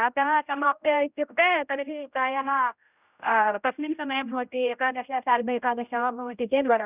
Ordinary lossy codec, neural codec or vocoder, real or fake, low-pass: none; codec, 16 kHz, 0.5 kbps, X-Codec, HuBERT features, trained on general audio; fake; 3.6 kHz